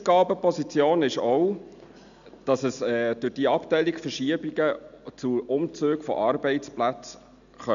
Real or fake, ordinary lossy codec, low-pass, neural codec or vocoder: real; none; 7.2 kHz; none